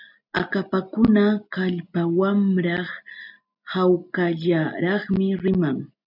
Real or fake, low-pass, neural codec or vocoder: real; 5.4 kHz; none